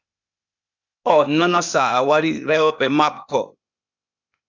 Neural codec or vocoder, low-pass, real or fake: codec, 16 kHz, 0.8 kbps, ZipCodec; 7.2 kHz; fake